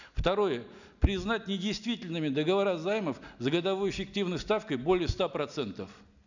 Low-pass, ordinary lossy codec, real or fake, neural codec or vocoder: 7.2 kHz; none; real; none